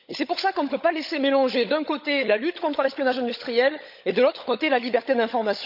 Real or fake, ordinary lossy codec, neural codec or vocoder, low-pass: fake; none; codec, 16 kHz, 16 kbps, FunCodec, trained on LibriTTS, 50 frames a second; 5.4 kHz